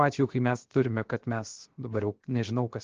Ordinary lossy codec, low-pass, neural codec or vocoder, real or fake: Opus, 16 kbps; 7.2 kHz; codec, 16 kHz, 0.7 kbps, FocalCodec; fake